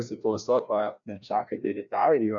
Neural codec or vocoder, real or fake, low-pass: codec, 16 kHz, 1 kbps, FreqCodec, larger model; fake; 7.2 kHz